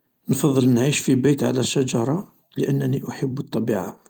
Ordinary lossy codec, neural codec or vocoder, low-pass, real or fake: Opus, 32 kbps; vocoder, 44.1 kHz, 128 mel bands every 256 samples, BigVGAN v2; 19.8 kHz; fake